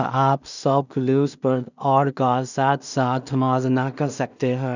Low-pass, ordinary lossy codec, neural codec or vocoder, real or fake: 7.2 kHz; none; codec, 16 kHz in and 24 kHz out, 0.4 kbps, LongCat-Audio-Codec, two codebook decoder; fake